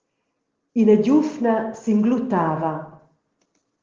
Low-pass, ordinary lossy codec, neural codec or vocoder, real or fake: 7.2 kHz; Opus, 16 kbps; none; real